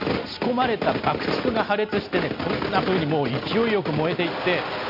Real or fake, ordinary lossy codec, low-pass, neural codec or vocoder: real; none; 5.4 kHz; none